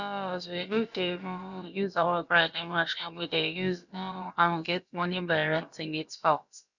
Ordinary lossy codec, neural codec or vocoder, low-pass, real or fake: none; codec, 16 kHz, about 1 kbps, DyCAST, with the encoder's durations; 7.2 kHz; fake